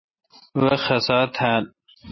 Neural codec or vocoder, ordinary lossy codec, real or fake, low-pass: none; MP3, 24 kbps; real; 7.2 kHz